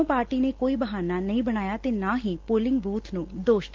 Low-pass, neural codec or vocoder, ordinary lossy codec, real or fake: 7.2 kHz; none; Opus, 16 kbps; real